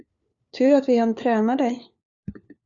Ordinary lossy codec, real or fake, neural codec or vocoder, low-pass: Opus, 64 kbps; fake; codec, 16 kHz, 4 kbps, FunCodec, trained on LibriTTS, 50 frames a second; 7.2 kHz